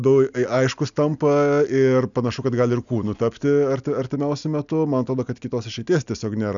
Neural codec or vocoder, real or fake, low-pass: none; real; 7.2 kHz